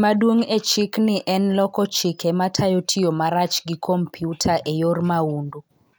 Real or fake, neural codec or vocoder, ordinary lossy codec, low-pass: real; none; none; none